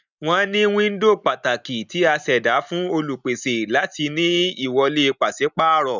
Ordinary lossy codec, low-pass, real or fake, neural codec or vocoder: none; 7.2 kHz; real; none